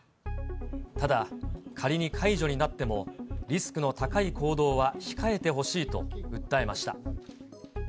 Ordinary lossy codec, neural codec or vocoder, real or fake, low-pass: none; none; real; none